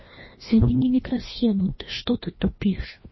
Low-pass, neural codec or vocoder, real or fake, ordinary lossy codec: 7.2 kHz; codec, 16 kHz, 1 kbps, FreqCodec, larger model; fake; MP3, 24 kbps